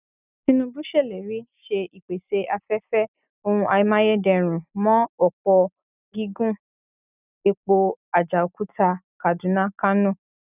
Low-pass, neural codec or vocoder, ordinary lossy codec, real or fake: 3.6 kHz; none; none; real